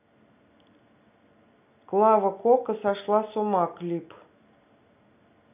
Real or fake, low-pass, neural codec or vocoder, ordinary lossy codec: real; 3.6 kHz; none; none